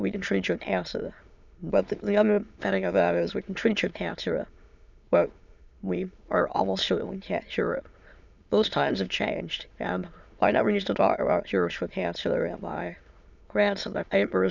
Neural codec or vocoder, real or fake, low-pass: autoencoder, 22.05 kHz, a latent of 192 numbers a frame, VITS, trained on many speakers; fake; 7.2 kHz